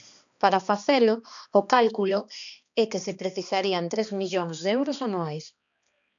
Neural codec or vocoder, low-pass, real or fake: codec, 16 kHz, 2 kbps, X-Codec, HuBERT features, trained on balanced general audio; 7.2 kHz; fake